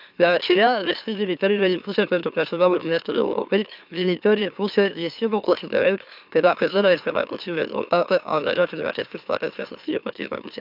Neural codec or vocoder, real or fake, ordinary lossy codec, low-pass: autoencoder, 44.1 kHz, a latent of 192 numbers a frame, MeloTTS; fake; none; 5.4 kHz